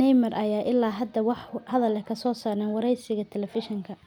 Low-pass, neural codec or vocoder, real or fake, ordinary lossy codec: 19.8 kHz; none; real; none